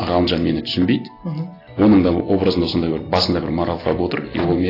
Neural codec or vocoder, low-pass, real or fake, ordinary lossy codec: none; 5.4 kHz; real; AAC, 24 kbps